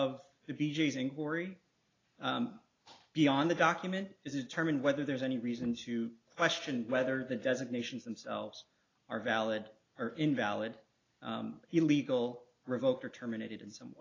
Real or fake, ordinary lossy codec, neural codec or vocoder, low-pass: real; AAC, 32 kbps; none; 7.2 kHz